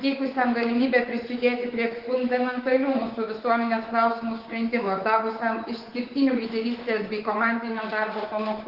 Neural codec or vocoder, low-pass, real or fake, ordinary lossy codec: codec, 24 kHz, 3.1 kbps, DualCodec; 5.4 kHz; fake; Opus, 16 kbps